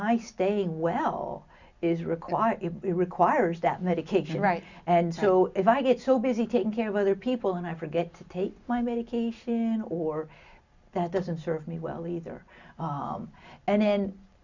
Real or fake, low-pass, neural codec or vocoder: real; 7.2 kHz; none